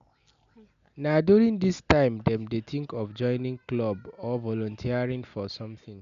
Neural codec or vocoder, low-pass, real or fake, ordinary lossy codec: none; 7.2 kHz; real; none